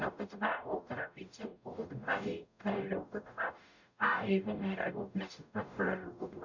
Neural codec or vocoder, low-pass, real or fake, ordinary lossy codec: codec, 44.1 kHz, 0.9 kbps, DAC; 7.2 kHz; fake; none